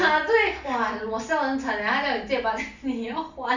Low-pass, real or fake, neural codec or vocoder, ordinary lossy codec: 7.2 kHz; real; none; none